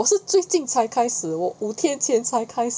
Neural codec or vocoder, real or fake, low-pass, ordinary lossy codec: none; real; none; none